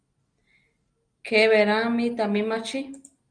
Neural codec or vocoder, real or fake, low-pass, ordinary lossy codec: none; real; 9.9 kHz; Opus, 32 kbps